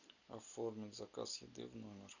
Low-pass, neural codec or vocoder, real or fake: 7.2 kHz; none; real